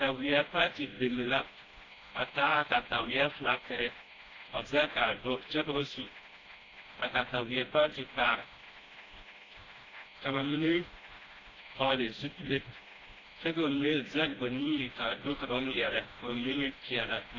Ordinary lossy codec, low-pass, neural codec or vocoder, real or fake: AAC, 32 kbps; 7.2 kHz; codec, 16 kHz, 1 kbps, FreqCodec, smaller model; fake